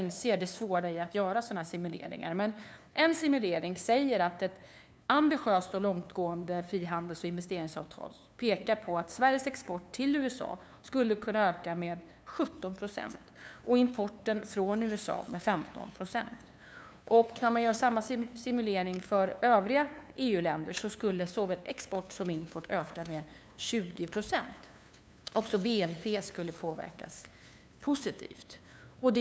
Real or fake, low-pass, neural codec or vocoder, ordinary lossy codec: fake; none; codec, 16 kHz, 2 kbps, FunCodec, trained on LibriTTS, 25 frames a second; none